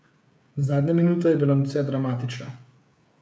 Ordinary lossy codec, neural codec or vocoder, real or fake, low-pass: none; codec, 16 kHz, 16 kbps, FreqCodec, smaller model; fake; none